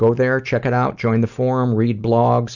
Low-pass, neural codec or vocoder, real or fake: 7.2 kHz; none; real